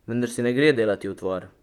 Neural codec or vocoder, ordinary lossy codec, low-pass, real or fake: vocoder, 44.1 kHz, 128 mel bands, Pupu-Vocoder; none; 19.8 kHz; fake